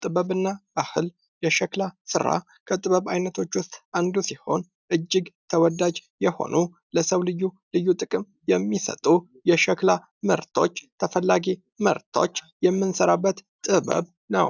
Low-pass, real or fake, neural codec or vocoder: 7.2 kHz; real; none